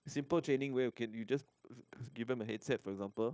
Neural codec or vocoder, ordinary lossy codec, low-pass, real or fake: codec, 16 kHz, 0.9 kbps, LongCat-Audio-Codec; none; none; fake